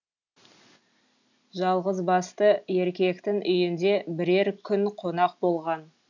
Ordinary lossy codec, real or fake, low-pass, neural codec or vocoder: none; real; 7.2 kHz; none